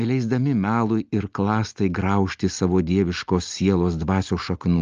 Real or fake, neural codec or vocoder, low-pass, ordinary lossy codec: real; none; 7.2 kHz; Opus, 24 kbps